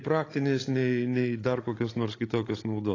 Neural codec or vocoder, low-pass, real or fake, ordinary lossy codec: codec, 16 kHz, 16 kbps, FreqCodec, larger model; 7.2 kHz; fake; AAC, 32 kbps